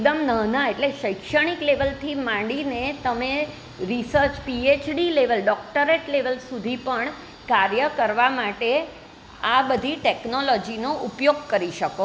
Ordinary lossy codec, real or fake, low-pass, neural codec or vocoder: none; real; none; none